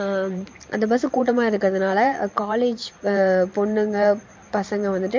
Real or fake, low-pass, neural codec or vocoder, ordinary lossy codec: fake; 7.2 kHz; vocoder, 44.1 kHz, 128 mel bands every 512 samples, BigVGAN v2; MP3, 48 kbps